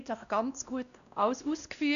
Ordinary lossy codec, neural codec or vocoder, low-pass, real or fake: none; codec, 16 kHz, 1 kbps, X-Codec, WavLM features, trained on Multilingual LibriSpeech; 7.2 kHz; fake